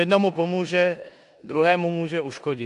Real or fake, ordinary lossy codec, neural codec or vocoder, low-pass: fake; AAC, 64 kbps; codec, 16 kHz in and 24 kHz out, 0.9 kbps, LongCat-Audio-Codec, four codebook decoder; 10.8 kHz